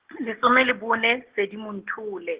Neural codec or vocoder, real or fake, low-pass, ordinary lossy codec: none; real; 3.6 kHz; Opus, 16 kbps